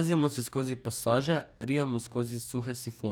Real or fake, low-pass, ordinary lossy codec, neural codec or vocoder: fake; none; none; codec, 44.1 kHz, 2.6 kbps, DAC